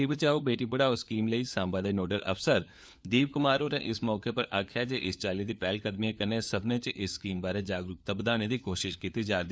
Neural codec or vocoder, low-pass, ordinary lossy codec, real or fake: codec, 16 kHz, 4 kbps, FunCodec, trained on LibriTTS, 50 frames a second; none; none; fake